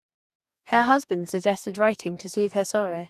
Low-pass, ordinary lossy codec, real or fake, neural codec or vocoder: 14.4 kHz; none; fake; codec, 44.1 kHz, 2.6 kbps, DAC